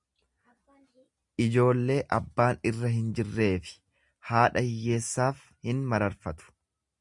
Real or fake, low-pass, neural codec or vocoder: real; 10.8 kHz; none